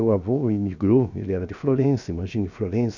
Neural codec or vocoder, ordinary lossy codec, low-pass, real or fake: codec, 16 kHz, 0.7 kbps, FocalCodec; none; 7.2 kHz; fake